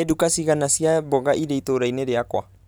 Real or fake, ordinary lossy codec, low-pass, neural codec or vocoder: real; none; none; none